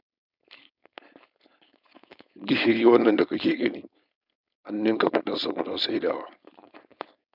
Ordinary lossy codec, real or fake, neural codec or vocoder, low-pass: none; fake; codec, 16 kHz, 4.8 kbps, FACodec; 5.4 kHz